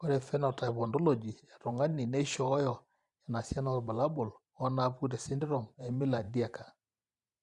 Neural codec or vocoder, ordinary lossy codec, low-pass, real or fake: vocoder, 44.1 kHz, 128 mel bands every 512 samples, BigVGAN v2; Opus, 64 kbps; 10.8 kHz; fake